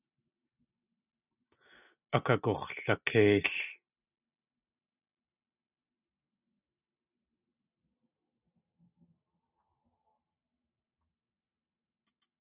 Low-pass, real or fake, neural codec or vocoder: 3.6 kHz; real; none